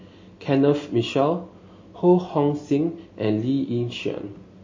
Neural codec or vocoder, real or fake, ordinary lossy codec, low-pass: none; real; MP3, 32 kbps; 7.2 kHz